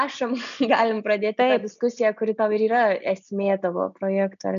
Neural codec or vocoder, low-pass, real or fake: none; 7.2 kHz; real